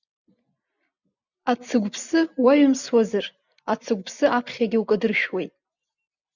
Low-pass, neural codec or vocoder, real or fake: 7.2 kHz; none; real